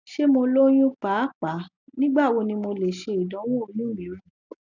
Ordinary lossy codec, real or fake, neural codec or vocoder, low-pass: none; real; none; 7.2 kHz